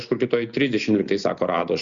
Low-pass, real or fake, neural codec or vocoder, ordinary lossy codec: 7.2 kHz; real; none; Opus, 64 kbps